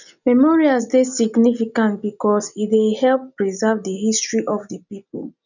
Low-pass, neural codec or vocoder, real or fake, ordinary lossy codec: 7.2 kHz; none; real; none